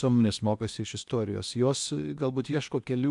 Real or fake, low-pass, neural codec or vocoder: fake; 10.8 kHz; codec, 16 kHz in and 24 kHz out, 0.8 kbps, FocalCodec, streaming, 65536 codes